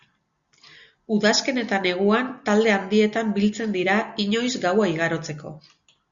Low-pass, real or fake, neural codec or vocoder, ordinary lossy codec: 7.2 kHz; real; none; Opus, 64 kbps